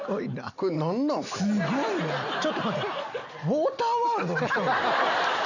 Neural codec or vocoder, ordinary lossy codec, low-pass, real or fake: none; none; 7.2 kHz; real